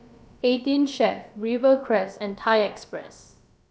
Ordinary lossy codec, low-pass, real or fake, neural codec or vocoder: none; none; fake; codec, 16 kHz, about 1 kbps, DyCAST, with the encoder's durations